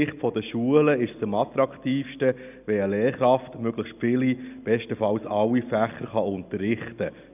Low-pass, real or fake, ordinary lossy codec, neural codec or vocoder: 3.6 kHz; real; none; none